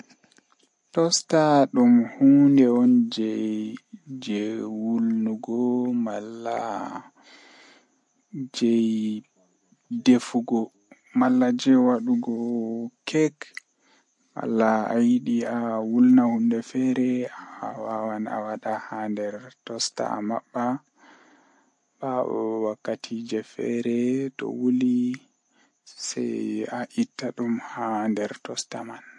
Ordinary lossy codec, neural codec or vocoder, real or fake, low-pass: MP3, 48 kbps; none; real; 10.8 kHz